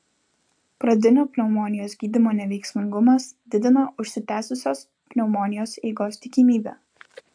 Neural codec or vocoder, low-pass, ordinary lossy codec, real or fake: none; 9.9 kHz; AAC, 64 kbps; real